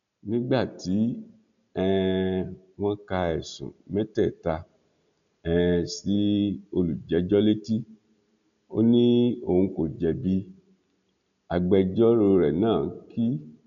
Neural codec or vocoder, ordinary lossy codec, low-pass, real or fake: none; none; 7.2 kHz; real